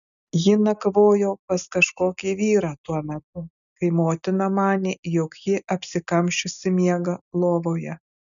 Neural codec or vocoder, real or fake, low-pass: none; real; 7.2 kHz